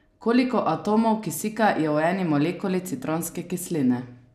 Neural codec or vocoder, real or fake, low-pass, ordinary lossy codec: none; real; 14.4 kHz; none